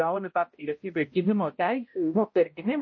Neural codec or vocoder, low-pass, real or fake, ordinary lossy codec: codec, 16 kHz, 0.5 kbps, X-Codec, HuBERT features, trained on balanced general audio; 7.2 kHz; fake; MP3, 32 kbps